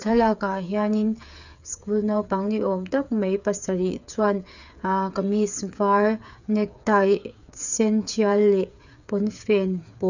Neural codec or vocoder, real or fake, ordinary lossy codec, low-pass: codec, 16 kHz, 8 kbps, FreqCodec, smaller model; fake; none; 7.2 kHz